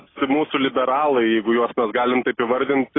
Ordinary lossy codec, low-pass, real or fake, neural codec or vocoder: AAC, 16 kbps; 7.2 kHz; real; none